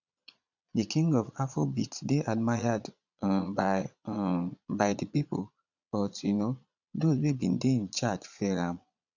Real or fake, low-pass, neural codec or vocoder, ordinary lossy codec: fake; 7.2 kHz; vocoder, 22.05 kHz, 80 mel bands, Vocos; none